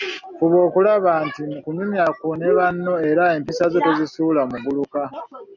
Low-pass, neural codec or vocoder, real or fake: 7.2 kHz; none; real